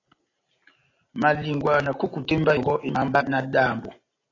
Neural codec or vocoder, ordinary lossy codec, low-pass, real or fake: none; AAC, 32 kbps; 7.2 kHz; real